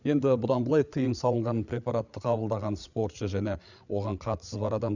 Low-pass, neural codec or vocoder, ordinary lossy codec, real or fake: 7.2 kHz; codec, 16 kHz, 8 kbps, FreqCodec, larger model; none; fake